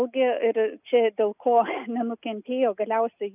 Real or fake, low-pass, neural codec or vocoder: real; 3.6 kHz; none